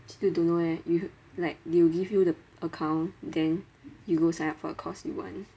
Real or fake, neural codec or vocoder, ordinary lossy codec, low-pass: real; none; none; none